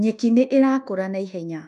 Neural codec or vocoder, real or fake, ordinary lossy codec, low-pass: codec, 24 kHz, 1.2 kbps, DualCodec; fake; none; 10.8 kHz